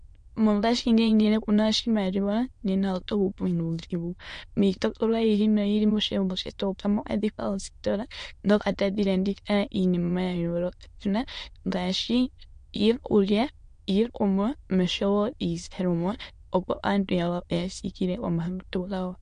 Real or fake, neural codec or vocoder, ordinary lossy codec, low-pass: fake; autoencoder, 22.05 kHz, a latent of 192 numbers a frame, VITS, trained on many speakers; MP3, 48 kbps; 9.9 kHz